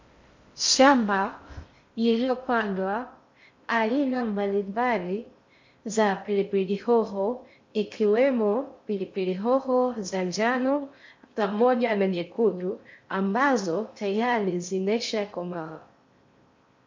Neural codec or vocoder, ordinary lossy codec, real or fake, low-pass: codec, 16 kHz in and 24 kHz out, 0.6 kbps, FocalCodec, streaming, 2048 codes; MP3, 48 kbps; fake; 7.2 kHz